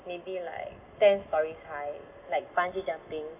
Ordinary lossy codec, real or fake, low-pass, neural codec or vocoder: none; real; 3.6 kHz; none